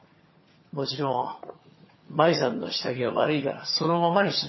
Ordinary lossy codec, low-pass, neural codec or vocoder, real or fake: MP3, 24 kbps; 7.2 kHz; vocoder, 22.05 kHz, 80 mel bands, HiFi-GAN; fake